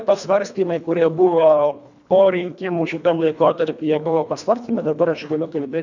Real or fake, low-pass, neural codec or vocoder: fake; 7.2 kHz; codec, 24 kHz, 1.5 kbps, HILCodec